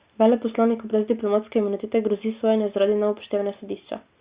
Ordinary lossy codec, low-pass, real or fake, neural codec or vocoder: Opus, 64 kbps; 3.6 kHz; real; none